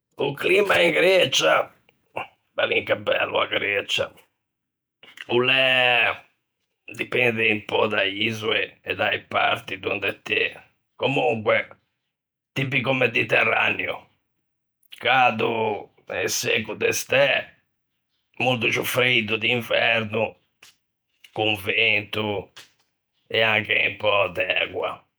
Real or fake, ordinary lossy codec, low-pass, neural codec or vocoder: fake; none; none; vocoder, 48 kHz, 128 mel bands, Vocos